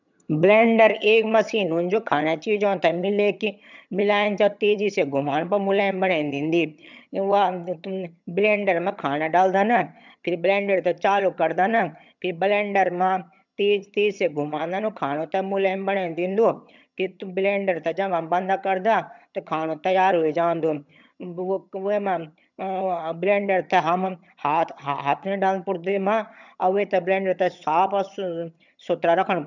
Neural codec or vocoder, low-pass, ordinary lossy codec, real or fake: vocoder, 22.05 kHz, 80 mel bands, HiFi-GAN; 7.2 kHz; none; fake